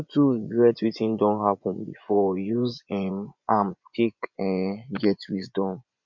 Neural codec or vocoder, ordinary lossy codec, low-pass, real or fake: vocoder, 24 kHz, 100 mel bands, Vocos; none; 7.2 kHz; fake